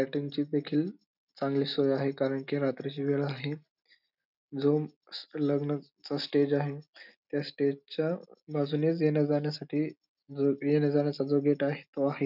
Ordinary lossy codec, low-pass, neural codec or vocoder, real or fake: none; 5.4 kHz; none; real